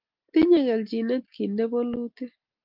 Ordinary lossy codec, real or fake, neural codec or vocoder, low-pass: Opus, 24 kbps; real; none; 5.4 kHz